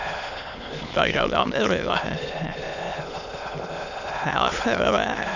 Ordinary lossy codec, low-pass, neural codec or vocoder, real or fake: Opus, 64 kbps; 7.2 kHz; autoencoder, 22.05 kHz, a latent of 192 numbers a frame, VITS, trained on many speakers; fake